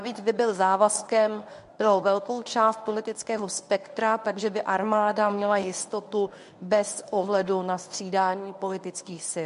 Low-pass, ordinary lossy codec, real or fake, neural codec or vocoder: 10.8 kHz; MP3, 64 kbps; fake; codec, 24 kHz, 0.9 kbps, WavTokenizer, medium speech release version 1